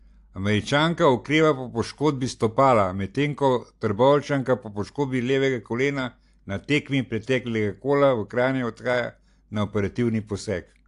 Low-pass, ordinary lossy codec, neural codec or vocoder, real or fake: 10.8 kHz; AAC, 64 kbps; none; real